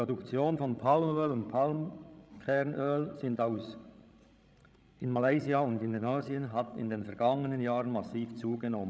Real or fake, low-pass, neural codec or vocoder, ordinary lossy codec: fake; none; codec, 16 kHz, 16 kbps, FreqCodec, larger model; none